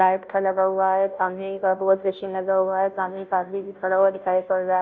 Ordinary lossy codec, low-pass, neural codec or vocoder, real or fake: none; 7.2 kHz; codec, 16 kHz, 0.5 kbps, FunCodec, trained on Chinese and English, 25 frames a second; fake